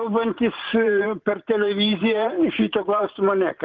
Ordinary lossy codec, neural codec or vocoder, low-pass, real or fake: Opus, 32 kbps; vocoder, 44.1 kHz, 128 mel bands every 512 samples, BigVGAN v2; 7.2 kHz; fake